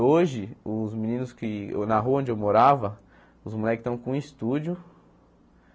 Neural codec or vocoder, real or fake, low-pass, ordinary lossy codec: none; real; none; none